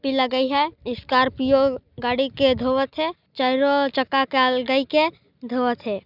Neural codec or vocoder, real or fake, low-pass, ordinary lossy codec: none; real; 5.4 kHz; none